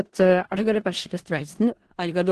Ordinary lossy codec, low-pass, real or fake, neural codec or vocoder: Opus, 16 kbps; 10.8 kHz; fake; codec, 16 kHz in and 24 kHz out, 0.4 kbps, LongCat-Audio-Codec, four codebook decoder